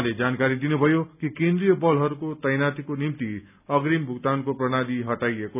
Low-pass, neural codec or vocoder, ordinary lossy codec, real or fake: 3.6 kHz; none; none; real